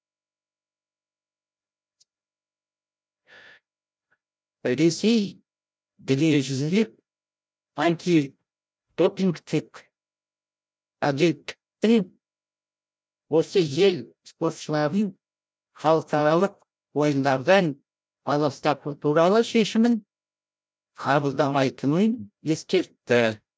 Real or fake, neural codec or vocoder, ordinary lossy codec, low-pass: fake; codec, 16 kHz, 0.5 kbps, FreqCodec, larger model; none; none